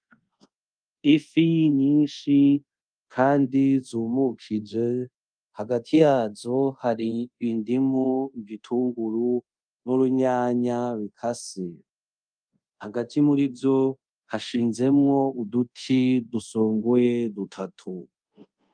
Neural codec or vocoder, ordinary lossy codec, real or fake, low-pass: codec, 24 kHz, 0.5 kbps, DualCodec; Opus, 32 kbps; fake; 9.9 kHz